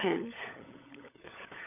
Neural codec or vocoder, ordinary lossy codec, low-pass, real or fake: codec, 16 kHz, 16 kbps, FunCodec, trained on LibriTTS, 50 frames a second; none; 3.6 kHz; fake